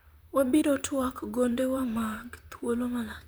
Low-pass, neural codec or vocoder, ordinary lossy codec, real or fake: none; vocoder, 44.1 kHz, 128 mel bands, Pupu-Vocoder; none; fake